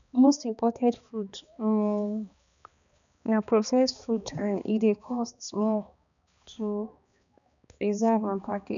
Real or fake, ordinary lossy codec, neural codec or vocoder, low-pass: fake; none; codec, 16 kHz, 2 kbps, X-Codec, HuBERT features, trained on balanced general audio; 7.2 kHz